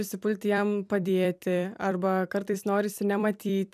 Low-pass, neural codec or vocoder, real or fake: 14.4 kHz; vocoder, 44.1 kHz, 128 mel bands every 256 samples, BigVGAN v2; fake